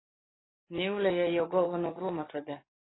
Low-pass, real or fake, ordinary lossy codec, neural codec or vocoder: 7.2 kHz; fake; AAC, 16 kbps; codec, 44.1 kHz, 7.8 kbps, DAC